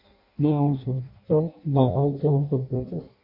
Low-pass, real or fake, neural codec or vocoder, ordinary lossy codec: 5.4 kHz; fake; codec, 16 kHz in and 24 kHz out, 0.6 kbps, FireRedTTS-2 codec; MP3, 32 kbps